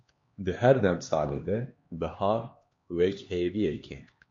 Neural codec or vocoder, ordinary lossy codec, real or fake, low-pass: codec, 16 kHz, 2 kbps, X-Codec, HuBERT features, trained on LibriSpeech; MP3, 48 kbps; fake; 7.2 kHz